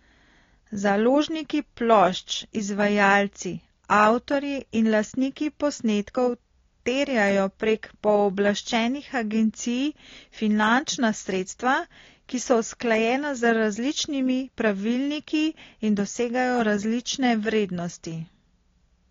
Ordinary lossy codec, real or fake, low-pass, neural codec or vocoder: AAC, 32 kbps; real; 7.2 kHz; none